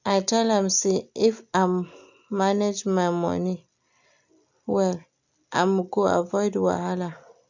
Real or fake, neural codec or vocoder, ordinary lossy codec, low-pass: real; none; none; 7.2 kHz